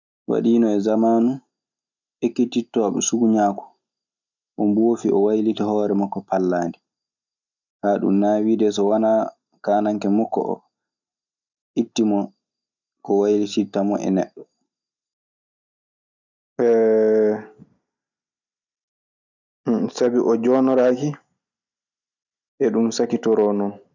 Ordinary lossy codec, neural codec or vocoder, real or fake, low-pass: none; none; real; 7.2 kHz